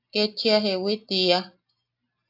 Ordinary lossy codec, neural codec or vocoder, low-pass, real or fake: AAC, 48 kbps; none; 5.4 kHz; real